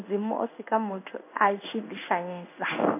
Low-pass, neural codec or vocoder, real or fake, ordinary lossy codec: 3.6 kHz; codec, 16 kHz in and 24 kHz out, 1 kbps, XY-Tokenizer; fake; none